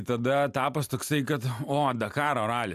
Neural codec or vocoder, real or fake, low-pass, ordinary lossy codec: none; real; 14.4 kHz; AAC, 96 kbps